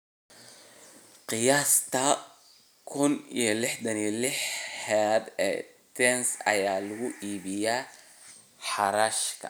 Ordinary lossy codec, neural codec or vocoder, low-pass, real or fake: none; none; none; real